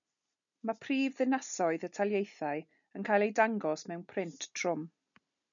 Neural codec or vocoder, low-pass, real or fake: none; 7.2 kHz; real